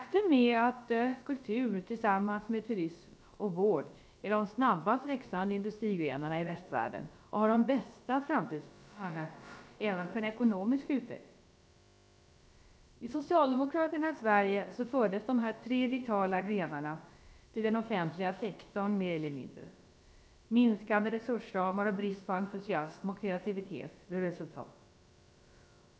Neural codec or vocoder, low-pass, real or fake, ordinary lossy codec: codec, 16 kHz, about 1 kbps, DyCAST, with the encoder's durations; none; fake; none